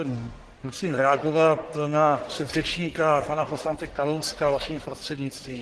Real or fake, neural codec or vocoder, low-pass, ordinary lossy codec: fake; codec, 44.1 kHz, 1.7 kbps, Pupu-Codec; 10.8 kHz; Opus, 16 kbps